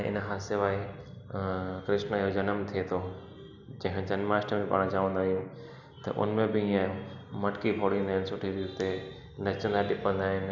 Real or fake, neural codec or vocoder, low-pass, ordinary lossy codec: real; none; 7.2 kHz; none